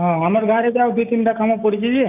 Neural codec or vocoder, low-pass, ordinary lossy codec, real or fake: codec, 16 kHz, 6 kbps, DAC; 3.6 kHz; none; fake